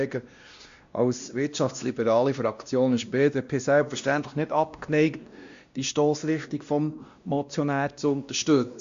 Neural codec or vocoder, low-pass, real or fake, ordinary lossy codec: codec, 16 kHz, 1 kbps, X-Codec, WavLM features, trained on Multilingual LibriSpeech; 7.2 kHz; fake; Opus, 64 kbps